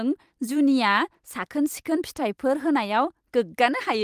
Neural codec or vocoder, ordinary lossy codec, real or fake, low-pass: vocoder, 44.1 kHz, 128 mel bands every 256 samples, BigVGAN v2; Opus, 24 kbps; fake; 14.4 kHz